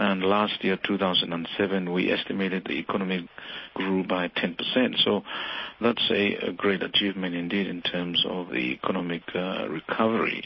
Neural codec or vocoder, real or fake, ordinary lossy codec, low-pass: none; real; MP3, 24 kbps; 7.2 kHz